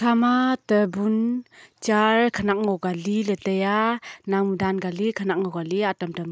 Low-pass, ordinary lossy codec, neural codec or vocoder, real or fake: none; none; none; real